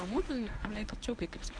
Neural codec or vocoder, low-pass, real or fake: codec, 24 kHz, 0.9 kbps, WavTokenizer, medium speech release version 1; 9.9 kHz; fake